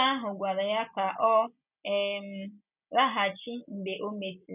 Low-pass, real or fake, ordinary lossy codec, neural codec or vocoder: 3.6 kHz; real; none; none